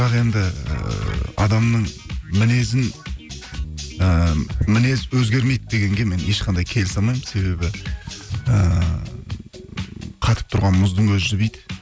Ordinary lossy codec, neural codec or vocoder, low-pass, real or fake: none; none; none; real